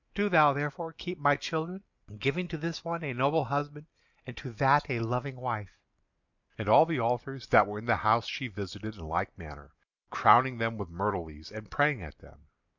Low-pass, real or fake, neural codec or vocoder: 7.2 kHz; real; none